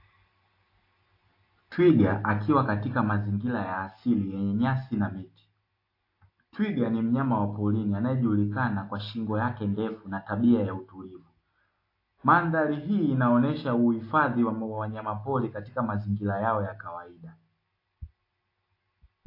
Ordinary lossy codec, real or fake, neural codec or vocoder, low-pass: AAC, 32 kbps; real; none; 5.4 kHz